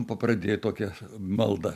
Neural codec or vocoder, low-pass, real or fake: none; 14.4 kHz; real